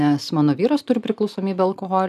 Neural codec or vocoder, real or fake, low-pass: none; real; 14.4 kHz